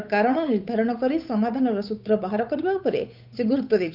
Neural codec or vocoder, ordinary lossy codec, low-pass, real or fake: codec, 16 kHz, 8 kbps, FunCodec, trained on Chinese and English, 25 frames a second; none; 5.4 kHz; fake